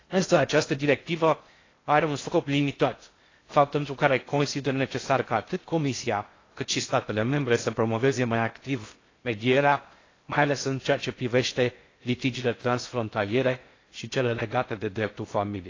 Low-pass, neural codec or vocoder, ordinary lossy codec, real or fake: 7.2 kHz; codec, 16 kHz in and 24 kHz out, 0.6 kbps, FocalCodec, streaming, 4096 codes; AAC, 32 kbps; fake